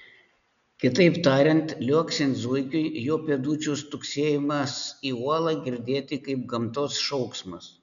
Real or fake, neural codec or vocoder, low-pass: real; none; 7.2 kHz